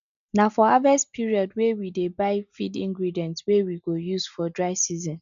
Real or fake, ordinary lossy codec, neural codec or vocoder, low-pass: real; none; none; 7.2 kHz